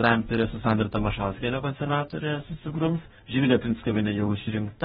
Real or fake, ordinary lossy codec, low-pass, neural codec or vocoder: fake; AAC, 16 kbps; 19.8 kHz; codec, 44.1 kHz, 2.6 kbps, DAC